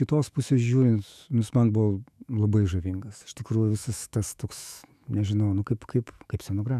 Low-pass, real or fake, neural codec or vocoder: 14.4 kHz; fake; autoencoder, 48 kHz, 128 numbers a frame, DAC-VAE, trained on Japanese speech